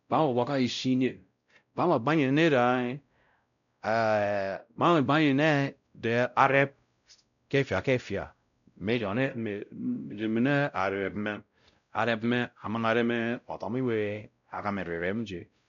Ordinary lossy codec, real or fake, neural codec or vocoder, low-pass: none; fake; codec, 16 kHz, 0.5 kbps, X-Codec, WavLM features, trained on Multilingual LibriSpeech; 7.2 kHz